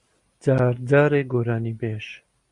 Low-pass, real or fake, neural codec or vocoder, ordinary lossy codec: 10.8 kHz; real; none; Opus, 64 kbps